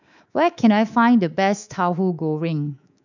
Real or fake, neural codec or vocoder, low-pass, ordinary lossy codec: fake; codec, 24 kHz, 3.1 kbps, DualCodec; 7.2 kHz; none